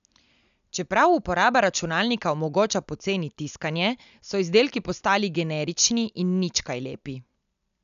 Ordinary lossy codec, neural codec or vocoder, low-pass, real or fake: none; none; 7.2 kHz; real